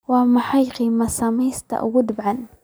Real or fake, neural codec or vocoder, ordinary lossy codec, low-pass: real; none; none; none